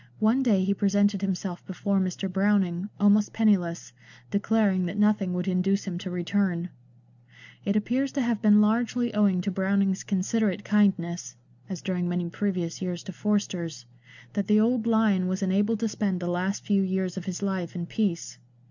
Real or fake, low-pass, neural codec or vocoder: real; 7.2 kHz; none